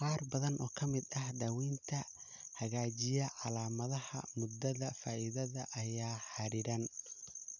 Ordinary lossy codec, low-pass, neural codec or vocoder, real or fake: none; 7.2 kHz; none; real